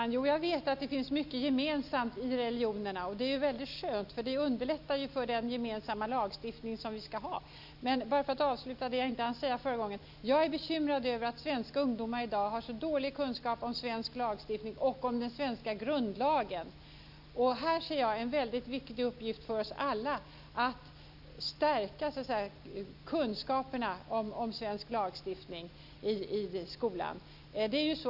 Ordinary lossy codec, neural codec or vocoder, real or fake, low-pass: none; none; real; 5.4 kHz